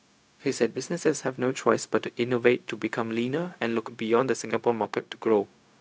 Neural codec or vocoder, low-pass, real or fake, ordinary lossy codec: codec, 16 kHz, 0.4 kbps, LongCat-Audio-Codec; none; fake; none